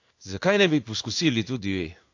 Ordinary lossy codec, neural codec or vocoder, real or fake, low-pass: AAC, 48 kbps; codec, 16 kHz in and 24 kHz out, 1 kbps, XY-Tokenizer; fake; 7.2 kHz